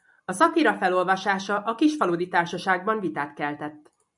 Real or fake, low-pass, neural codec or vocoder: real; 10.8 kHz; none